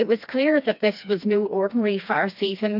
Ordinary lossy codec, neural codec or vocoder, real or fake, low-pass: AAC, 48 kbps; codec, 16 kHz, 2 kbps, FreqCodec, smaller model; fake; 5.4 kHz